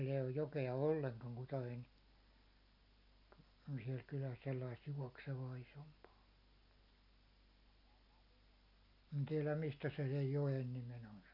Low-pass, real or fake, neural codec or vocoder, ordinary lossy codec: 5.4 kHz; real; none; none